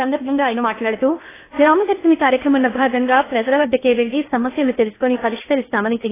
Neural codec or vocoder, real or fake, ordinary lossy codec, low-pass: codec, 16 kHz in and 24 kHz out, 0.8 kbps, FocalCodec, streaming, 65536 codes; fake; AAC, 16 kbps; 3.6 kHz